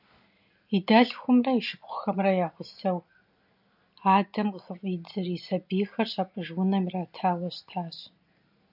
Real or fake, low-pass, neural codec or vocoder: real; 5.4 kHz; none